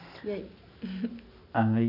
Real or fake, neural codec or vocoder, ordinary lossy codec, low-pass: real; none; none; 5.4 kHz